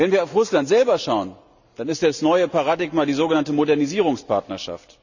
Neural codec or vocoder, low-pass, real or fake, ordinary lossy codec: none; 7.2 kHz; real; none